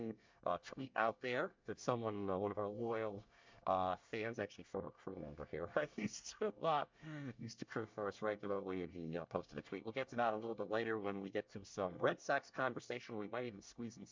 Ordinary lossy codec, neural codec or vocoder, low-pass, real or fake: MP3, 64 kbps; codec, 24 kHz, 1 kbps, SNAC; 7.2 kHz; fake